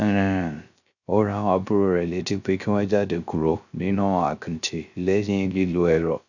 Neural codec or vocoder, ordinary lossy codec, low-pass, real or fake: codec, 16 kHz, 0.3 kbps, FocalCodec; none; 7.2 kHz; fake